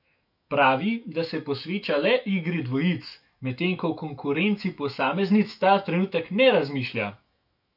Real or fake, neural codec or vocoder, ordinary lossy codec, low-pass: fake; autoencoder, 48 kHz, 128 numbers a frame, DAC-VAE, trained on Japanese speech; none; 5.4 kHz